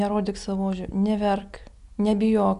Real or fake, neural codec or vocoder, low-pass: real; none; 10.8 kHz